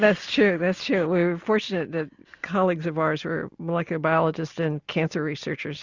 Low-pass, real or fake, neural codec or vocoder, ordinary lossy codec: 7.2 kHz; real; none; Opus, 64 kbps